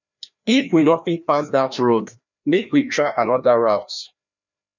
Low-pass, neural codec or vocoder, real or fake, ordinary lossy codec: 7.2 kHz; codec, 16 kHz, 1 kbps, FreqCodec, larger model; fake; none